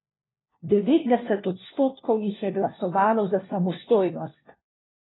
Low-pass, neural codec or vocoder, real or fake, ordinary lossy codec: 7.2 kHz; codec, 16 kHz, 1 kbps, FunCodec, trained on LibriTTS, 50 frames a second; fake; AAC, 16 kbps